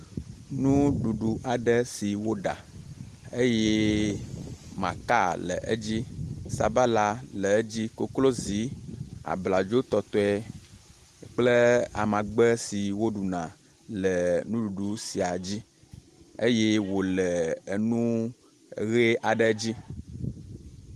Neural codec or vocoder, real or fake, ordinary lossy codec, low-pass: none; real; Opus, 24 kbps; 14.4 kHz